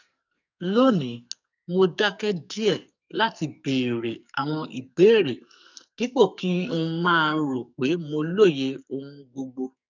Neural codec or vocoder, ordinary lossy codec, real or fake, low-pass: codec, 44.1 kHz, 2.6 kbps, SNAC; none; fake; 7.2 kHz